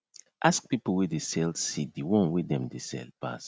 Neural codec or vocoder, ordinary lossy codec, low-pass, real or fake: none; none; none; real